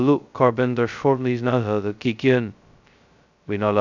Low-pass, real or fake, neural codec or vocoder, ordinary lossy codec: 7.2 kHz; fake; codec, 16 kHz, 0.2 kbps, FocalCodec; none